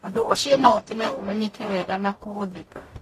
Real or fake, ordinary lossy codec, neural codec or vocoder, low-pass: fake; AAC, 48 kbps; codec, 44.1 kHz, 0.9 kbps, DAC; 14.4 kHz